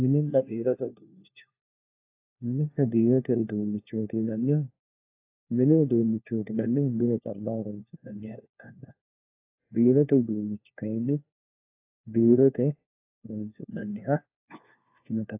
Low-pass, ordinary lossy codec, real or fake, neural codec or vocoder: 3.6 kHz; AAC, 32 kbps; fake; codec, 16 kHz, 1 kbps, FunCodec, trained on LibriTTS, 50 frames a second